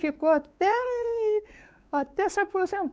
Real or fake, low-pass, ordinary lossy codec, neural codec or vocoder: fake; none; none; codec, 16 kHz, 4 kbps, X-Codec, WavLM features, trained on Multilingual LibriSpeech